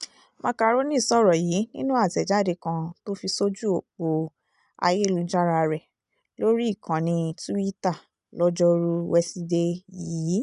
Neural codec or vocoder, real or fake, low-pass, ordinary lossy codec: none; real; 10.8 kHz; none